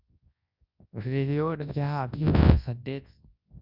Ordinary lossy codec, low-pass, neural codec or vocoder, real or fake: Opus, 64 kbps; 5.4 kHz; codec, 24 kHz, 0.9 kbps, WavTokenizer, large speech release; fake